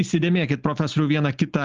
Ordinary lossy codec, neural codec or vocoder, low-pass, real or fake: Opus, 32 kbps; none; 7.2 kHz; real